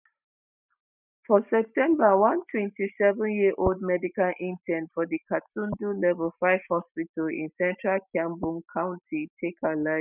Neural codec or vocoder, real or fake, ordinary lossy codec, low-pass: none; real; none; 3.6 kHz